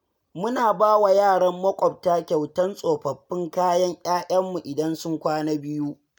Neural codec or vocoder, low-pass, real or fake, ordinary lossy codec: none; none; real; none